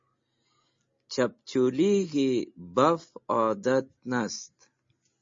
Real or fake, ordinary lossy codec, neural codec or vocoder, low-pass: real; MP3, 32 kbps; none; 7.2 kHz